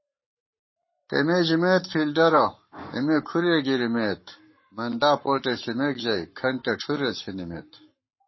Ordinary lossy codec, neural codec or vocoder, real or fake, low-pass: MP3, 24 kbps; codec, 44.1 kHz, 7.8 kbps, Pupu-Codec; fake; 7.2 kHz